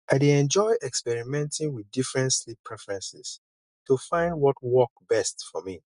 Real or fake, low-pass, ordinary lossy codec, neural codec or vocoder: real; 10.8 kHz; none; none